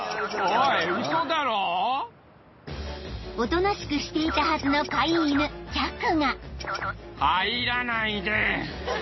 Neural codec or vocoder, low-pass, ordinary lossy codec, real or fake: none; 7.2 kHz; MP3, 24 kbps; real